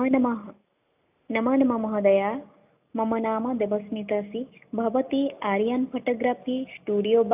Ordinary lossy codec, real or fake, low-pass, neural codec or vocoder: AAC, 32 kbps; real; 3.6 kHz; none